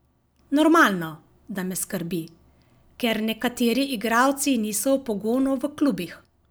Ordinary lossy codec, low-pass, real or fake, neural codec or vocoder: none; none; real; none